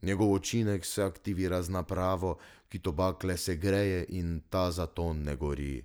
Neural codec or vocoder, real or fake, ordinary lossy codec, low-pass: none; real; none; none